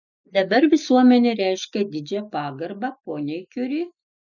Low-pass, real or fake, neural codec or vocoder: 7.2 kHz; real; none